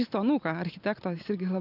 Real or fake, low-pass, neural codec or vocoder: real; 5.4 kHz; none